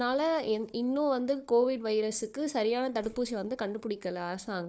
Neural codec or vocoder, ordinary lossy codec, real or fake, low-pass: codec, 16 kHz, 4 kbps, FunCodec, trained on Chinese and English, 50 frames a second; none; fake; none